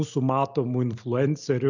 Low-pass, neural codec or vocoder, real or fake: 7.2 kHz; none; real